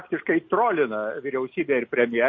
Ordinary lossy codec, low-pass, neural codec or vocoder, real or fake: MP3, 32 kbps; 7.2 kHz; none; real